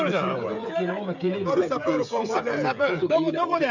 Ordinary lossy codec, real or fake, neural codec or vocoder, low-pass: none; fake; codec, 16 kHz, 16 kbps, FreqCodec, smaller model; 7.2 kHz